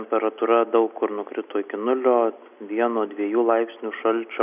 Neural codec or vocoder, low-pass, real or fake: none; 3.6 kHz; real